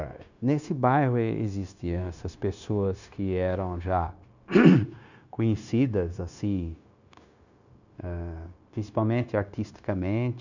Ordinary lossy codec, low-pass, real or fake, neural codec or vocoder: none; 7.2 kHz; fake; codec, 16 kHz, 0.9 kbps, LongCat-Audio-Codec